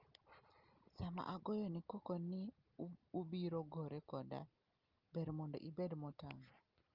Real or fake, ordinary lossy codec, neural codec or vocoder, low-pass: real; Opus, 24 kbps; none; 5.4 kHz